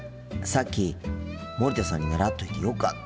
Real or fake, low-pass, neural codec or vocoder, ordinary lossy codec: real; none; none; none